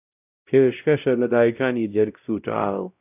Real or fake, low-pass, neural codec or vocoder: fake; 3.6 kHz; codec, 16 kHz, 0.5 kbps, X-Codec, WavLM features, trained on Multilingual LibriSpeech